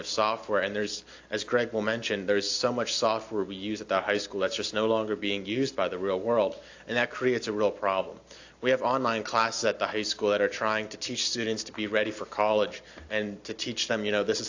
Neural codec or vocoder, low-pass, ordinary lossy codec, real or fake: none; 7.2 kHz; AAC, 48 kbps; real